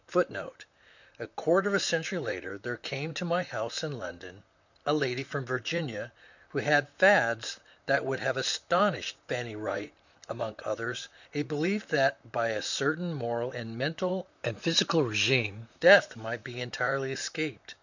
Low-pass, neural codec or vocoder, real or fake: 7.2 kHz; vocoder, 44.1 kHz, 128 mel bands, Pupu-Vocoder; fake